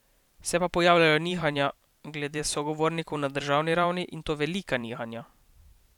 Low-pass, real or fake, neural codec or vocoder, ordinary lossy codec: 19.8 kHz; fake; vocoder, 44.1 kHz, 128 mel bands every 512 samples, BigVGAN v2; none